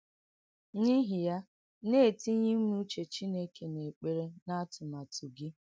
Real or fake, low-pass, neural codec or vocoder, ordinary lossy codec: real; none; none; none